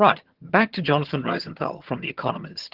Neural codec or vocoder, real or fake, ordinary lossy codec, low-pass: vocoder, 22.05 kHz, 80 mel bands, HiFi-GAN; fake; Opus, 16 kbps; 5.4 kHz